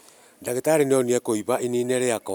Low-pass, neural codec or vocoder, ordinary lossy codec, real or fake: none; none; none; real